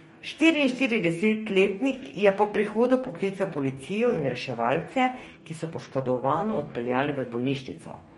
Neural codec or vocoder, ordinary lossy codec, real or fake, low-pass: codec, 44.1 kHz, 2.6 kbps, DAC; MP3, 48 kbps; fake; 19.8 kHz